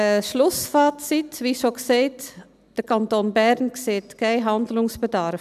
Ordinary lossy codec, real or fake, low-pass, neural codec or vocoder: none; real; 14.4 kHz; none